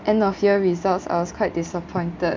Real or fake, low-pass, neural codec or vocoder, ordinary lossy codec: real; 7.2 kHz; none; MP3, 64 kbps